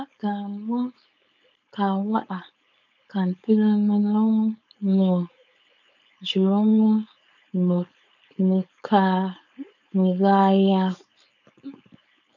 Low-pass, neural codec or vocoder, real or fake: 7.2 kHz; codec, 16 kHz, 4.8 kbps, FACodec; fake